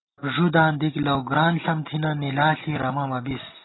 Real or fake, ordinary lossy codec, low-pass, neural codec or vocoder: real; AAC, 16 kbps; 7.2 kHz; none